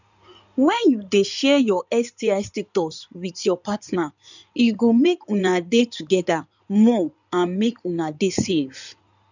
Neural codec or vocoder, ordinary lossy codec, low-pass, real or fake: codec, 16 kHz in and 24 kHz out, 2.2 kbps, FireRedTTS-2 codec; none; 7.2 kHz; fake